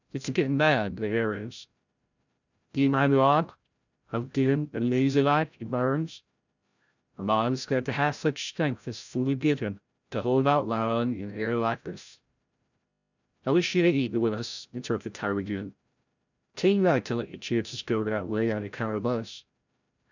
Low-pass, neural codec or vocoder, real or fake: 7.2 kHz; codec, 16 kHz, 0.5 kbps, FreqCodec, larger model; fake